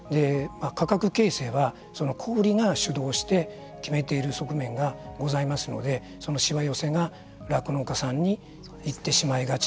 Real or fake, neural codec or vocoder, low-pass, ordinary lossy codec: real; none; none; none